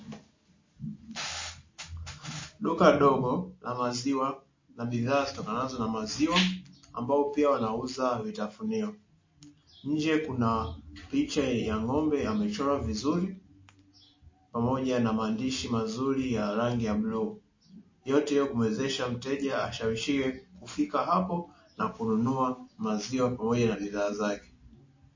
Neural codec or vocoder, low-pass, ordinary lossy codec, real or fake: none; 7.2 kHz; MP3, 32 kbps; real